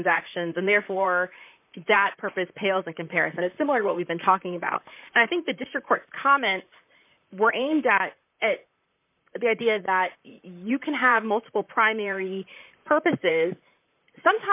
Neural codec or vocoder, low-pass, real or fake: vocoder, 44.1 kHz, 128 mel bands, Pupu-Vocoder; 3.6 kHz; fake